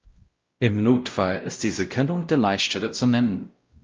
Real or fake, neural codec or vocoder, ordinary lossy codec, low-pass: fake; codec, 16 kHz, 0.5 kbps, X-Codec, WavLM features, trained on Multilingual LibriSpeech; Opus, 24 kbps; 7.2 kHz